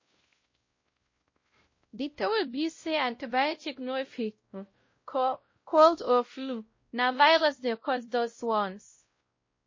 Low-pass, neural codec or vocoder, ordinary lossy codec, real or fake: 7.2 kHz; codec, 16 kHz, 0.5 kbps, X-Codec, WavLM features, trained on Multilingual LibriSpeech; MP3, 32 kbps; fake